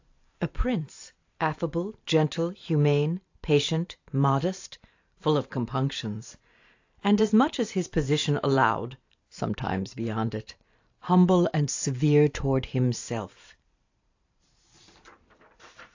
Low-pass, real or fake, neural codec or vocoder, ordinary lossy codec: 7.2 kHz; fake; vocoder, 44.1 kHz, 128 mel bands every 512 samples, BigVGAN v2; AAC, 48 kbps